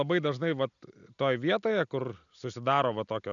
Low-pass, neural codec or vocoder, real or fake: 7.2 kHz; none; real